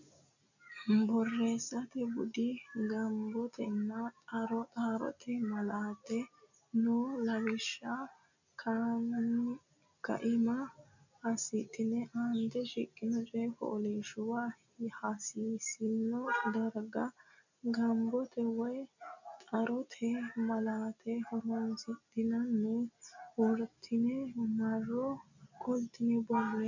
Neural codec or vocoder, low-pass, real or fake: none; 7.2 kHz; real